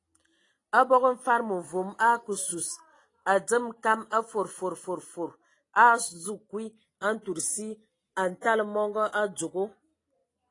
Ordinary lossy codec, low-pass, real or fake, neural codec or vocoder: AAC, 32 kbps; 10.8 kHz; real; none